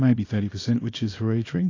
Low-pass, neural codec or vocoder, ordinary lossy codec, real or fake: 7.2 kHz; codec, 24 kHz, 0.9 kbps, WavTokenizer, small release; AAC, 32 kbps; fake